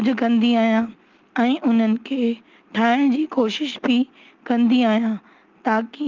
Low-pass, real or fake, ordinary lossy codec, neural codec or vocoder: 7.2 kHz; real; Opus, 32 kbps; none